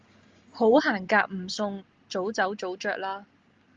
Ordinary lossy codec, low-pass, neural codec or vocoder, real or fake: Opus, 32 kbps; 7.2 kHz; none; real